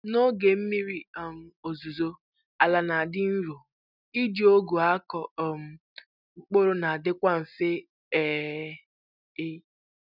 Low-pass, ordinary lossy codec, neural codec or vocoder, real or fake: 5.4 kHz; none; none; real